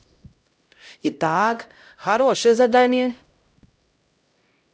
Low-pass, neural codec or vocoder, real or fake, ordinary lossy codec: none; codec, 16 kHz, 0.5 kbps, X-Codec, HuBERT features, trained on LibriSpeech; fake; none